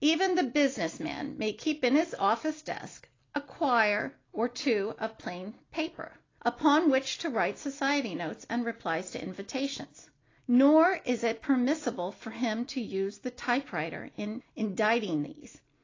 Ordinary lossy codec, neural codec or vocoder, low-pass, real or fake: AAC, 32 kbps; none; 7.2 kHz; real